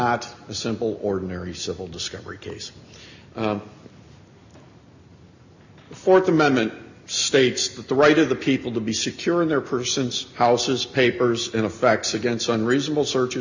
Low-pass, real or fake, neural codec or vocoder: 7.2 kHz; real; none